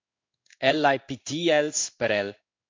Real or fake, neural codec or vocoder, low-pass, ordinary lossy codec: fake; codec, 16 kHz in and 24 kHz out, 1 kbps, XY-Tokenizer; 7.2 kHz; MP3, 64 kbps